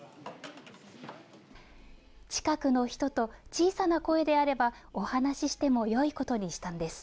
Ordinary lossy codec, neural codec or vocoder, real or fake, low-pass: none; none; real; none